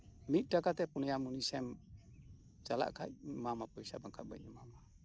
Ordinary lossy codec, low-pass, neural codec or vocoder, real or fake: none; none; none; real